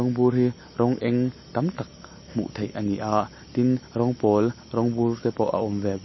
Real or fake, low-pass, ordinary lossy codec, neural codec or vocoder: real; 7.2 kHz; MP3, 24 kbps; none